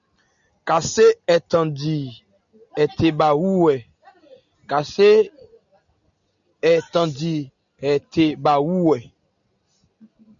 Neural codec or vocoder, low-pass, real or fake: none; 7.2 kHz; real